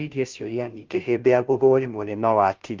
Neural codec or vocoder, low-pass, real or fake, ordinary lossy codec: codec, 16 kHz, 0.5 kbps, FunCodec, trained on Chinese and English, 25 frames a second; 7.2 kHz; fake; Opus, 24 kbps